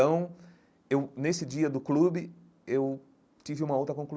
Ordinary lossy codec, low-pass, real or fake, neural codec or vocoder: none; none; real; none